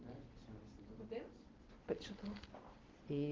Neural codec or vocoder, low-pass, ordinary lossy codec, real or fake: none; 7.2 kHz; Opus, 16 kbps; real